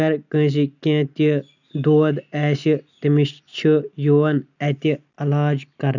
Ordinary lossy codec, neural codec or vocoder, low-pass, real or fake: none; none; 7.2 kHz; real